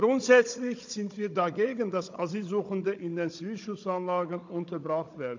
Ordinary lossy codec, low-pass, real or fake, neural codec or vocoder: MP3, 64 kbps; 7.2 kHz; fake; codec, 16 kHz, 16 kbps, FunCodec, trained on Chinese and English, 50 frames a second